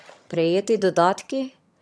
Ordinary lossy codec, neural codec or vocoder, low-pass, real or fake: none; vocoder, 22.05 kHz, 80 mel bands, HiFi-GAN; none; fake